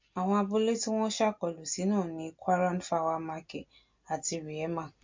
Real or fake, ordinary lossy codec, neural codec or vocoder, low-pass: real; MP3, 48 kbps; none; 7.2 kHz